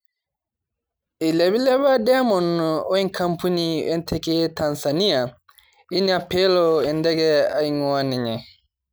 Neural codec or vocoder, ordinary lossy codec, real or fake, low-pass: none; none; real; none